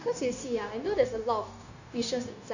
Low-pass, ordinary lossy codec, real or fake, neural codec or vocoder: 7.2 kHz; none; fake; codec, 16 kHz, 0.9 kbps, LongCat-Audio-Codec